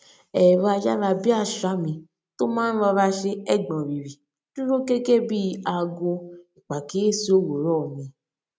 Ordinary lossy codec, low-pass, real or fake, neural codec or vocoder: none; none; real; none